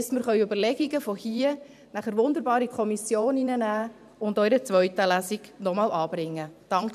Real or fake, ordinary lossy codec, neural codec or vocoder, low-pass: fake; none; vocoder, 44.1 kHz, 128 mel bands every 512 samples, BigVGAN v2; 14.4 kHz